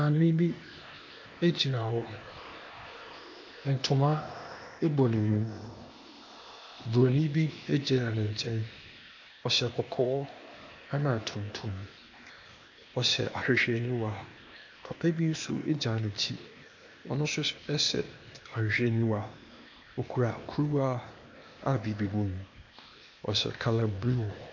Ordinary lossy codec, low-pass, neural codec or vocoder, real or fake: MP3, 48 kbps; 7.2 kHz; codec, 16 kHz, 0.8 kbps, ZipCodec; fake